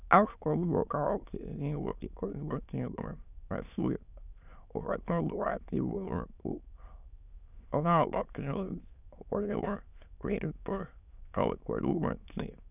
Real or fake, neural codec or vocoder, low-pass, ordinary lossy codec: fake; autoencoder, 22.05 kHz, a latent of 192 numbers a frame, VITS, trained on many speakers; 3.6 kHz; none